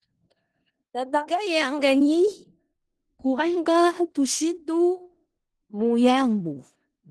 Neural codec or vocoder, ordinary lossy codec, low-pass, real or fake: codec, 16 kHz in and 24 kHz out, 0.4 kbps, LongCat-Audio-Codec, four codebook decoder; Opus, 16 kbps; 10.8 kHz; fake